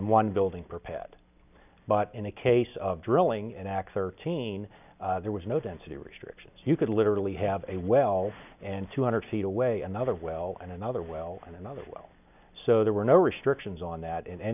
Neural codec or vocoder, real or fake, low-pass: none; real; 3.6 kHz